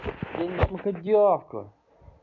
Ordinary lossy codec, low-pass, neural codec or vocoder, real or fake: none; 7.2 kHz; none; real